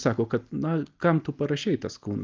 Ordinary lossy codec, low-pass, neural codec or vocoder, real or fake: Opus, 24 kbps; 7.2 kHz; none; real